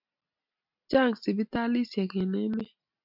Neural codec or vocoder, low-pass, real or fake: none; 5.4 kHz; real